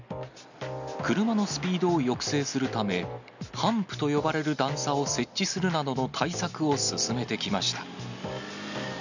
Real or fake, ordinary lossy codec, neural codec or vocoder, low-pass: real; none; none; 7.2 kHz